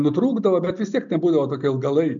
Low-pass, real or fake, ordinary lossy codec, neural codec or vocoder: 7.2 kHz; real; MP3, 96 kbps; none